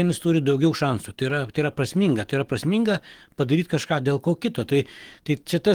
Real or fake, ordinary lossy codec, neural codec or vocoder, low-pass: fake; Opus, 24 kbps; codec, 44.1 kHz, 7.8 kbps, DAC; 19.8 kHz